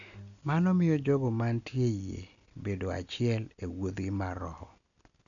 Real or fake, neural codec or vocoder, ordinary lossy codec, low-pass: real; none; none; 7.2 kHz